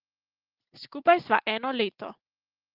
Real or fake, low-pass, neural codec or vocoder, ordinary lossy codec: real; 5.4 kHz; none; Opus, 16 kbps